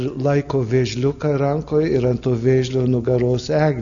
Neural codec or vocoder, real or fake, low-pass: none; real; 7.2 kHz